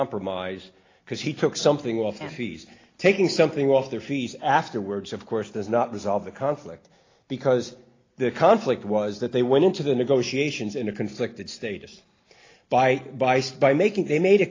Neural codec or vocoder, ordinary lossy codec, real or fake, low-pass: none; AAC, 32 kbps; real; 7.2 kHz